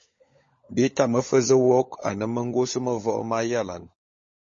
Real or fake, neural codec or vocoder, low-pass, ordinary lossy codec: fake; codec, 16 kHz, 16 kbps, FunCodec, trained on LibriTTS, 50 frames a second; 7.2 kHz; MP3, 32 kbps